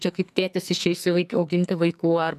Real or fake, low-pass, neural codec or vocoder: fake; 14.4 kHz; codec, 44.1 kHz, 2.6 kbps, SNAC